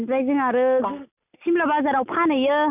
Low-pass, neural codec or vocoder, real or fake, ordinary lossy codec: 3.6 kHz; none; real; none